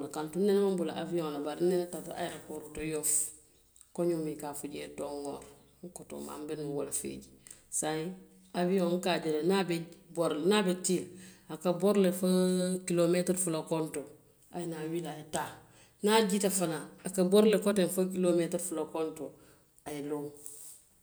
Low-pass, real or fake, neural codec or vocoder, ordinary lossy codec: none; real; none; none